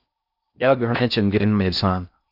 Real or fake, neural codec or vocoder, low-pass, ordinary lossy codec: fake; codec, 16 kHz in and 24 kHz out, 0.6 kbps, FocalCodec, streaming, 4096 codes; 5.4 kHz; Opus, 64 kbps